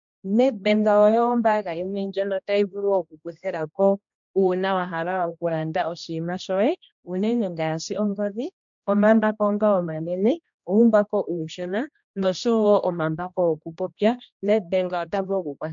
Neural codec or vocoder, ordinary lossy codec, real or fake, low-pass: codec, 16 kHz, 1 kbps, X-Codec, HuBERT features, trained on general audio; MP3, 64 kbps; fake; 7.2 kHz